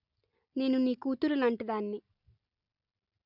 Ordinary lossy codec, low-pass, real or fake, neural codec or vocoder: none; 5.4 kHz; real; none